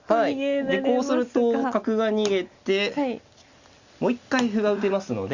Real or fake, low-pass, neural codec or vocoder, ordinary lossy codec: real; 7.2 kHz; none; Opus, 64 kbps